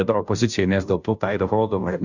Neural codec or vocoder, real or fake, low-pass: codec, 16 kHz, 0.5 kbps, FunCodec, trained on Chinese and English, 25 frames a second; fake; 7.2 kHz